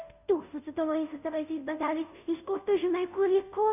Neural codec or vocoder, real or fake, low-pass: codec, 16 kHz, 0.5 kbps, FunCodec, trained on Chinese and English, 25 frames a second; fake; 3.6 kHz